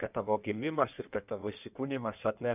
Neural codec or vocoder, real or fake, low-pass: codec, 16 kHz in and 24 kHz out, 1.1 kbps, FireRedTTS-2 codec; fake; 3.6 kHz